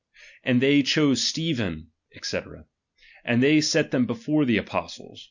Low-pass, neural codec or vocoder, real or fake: 7.2 kHz; none; real